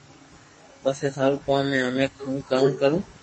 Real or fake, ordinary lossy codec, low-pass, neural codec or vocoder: fake; MP3, 32 kbps; 10.8 kHz; codec, 44.1 kHz, 2.6 kbps, SNAC